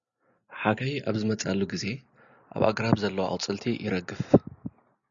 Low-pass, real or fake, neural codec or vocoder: 7.2 kHz; real; none